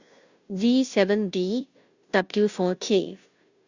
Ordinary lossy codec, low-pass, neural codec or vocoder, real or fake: Opus, 64 kbps; 7.2 kHz; codec, 16 kHz, 0.5 kbps, FunCodec, trained on Chinese and English, 25 frames a second; fake